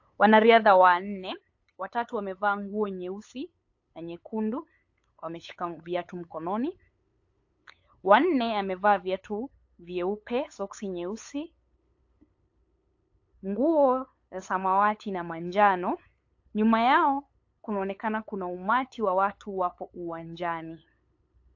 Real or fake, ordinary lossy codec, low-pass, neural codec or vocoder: fake; AAC, 48 kbps; 7.2 kHz; codec, 16 kHz, 8 kbps, FunCodec, trained on LibriTTS, 25 frames a second